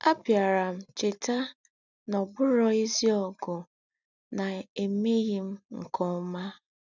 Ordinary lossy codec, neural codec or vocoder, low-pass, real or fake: none; none; 7.2 kHz; real